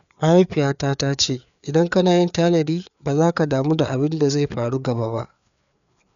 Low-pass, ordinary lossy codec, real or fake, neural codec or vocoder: 7.2 kHz; none; fake; codec, 16 kHz, 4 kbps, FreqCodec, larger model